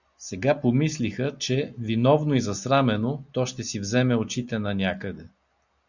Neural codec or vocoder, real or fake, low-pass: none; real; 7.2 kHz